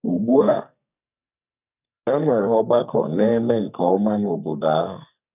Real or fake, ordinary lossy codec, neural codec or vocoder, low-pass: fake; none; codec, 44.1 kHz, 2.6 kbps, SNAC; 3.6 kHz